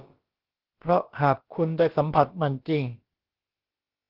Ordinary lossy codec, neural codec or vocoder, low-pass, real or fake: Opus, 16 kbps; codec, 16 kHz, about 1 kbps, DyCAST, with the encoder's durations; 5.4 kHz; fake